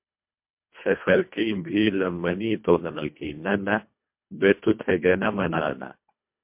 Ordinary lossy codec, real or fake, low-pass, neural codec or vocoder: MP3, 32 kbps; fake; 3.6 kHz; codec, 24 kHz, 1.5 kbps, HILCodec